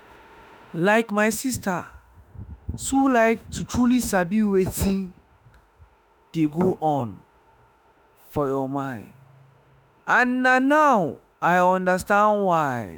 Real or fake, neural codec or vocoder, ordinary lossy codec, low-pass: fake; autoencoder, 48 kHz, 32 numbers a frame, DAC-VAE, trained on Japanese speech; none; none